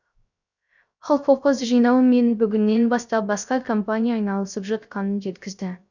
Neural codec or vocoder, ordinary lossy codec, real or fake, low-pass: codec, 16 kHz, 0.3 kbps, FocalCodec; none; fake; 7.2 kHz